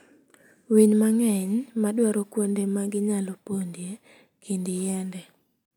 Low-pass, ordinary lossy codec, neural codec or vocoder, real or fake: none; none; none; real